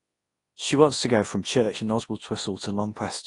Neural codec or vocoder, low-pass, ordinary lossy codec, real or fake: codec, 24 kHz, 0.9 kbps, WavTokenizer, large speech release; 10.8 kHz; AAC, 32 kbps; fake